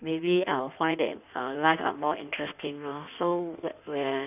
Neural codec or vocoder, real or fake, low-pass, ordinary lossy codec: codec, 16 kHz in and 24 kHz out, 1.1 kbps, FireRedTTS-2 codec; fake; 3.6 kHz; none